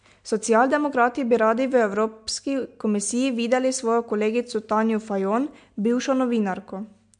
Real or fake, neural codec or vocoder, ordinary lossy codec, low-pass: real; none; MP3, 64 kbps; 9.9 kHz